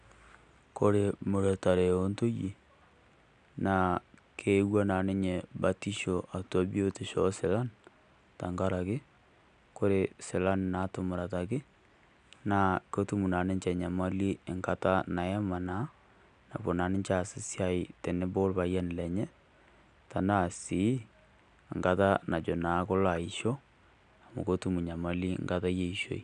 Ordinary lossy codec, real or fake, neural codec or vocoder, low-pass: none; real; none; 9.9 kHz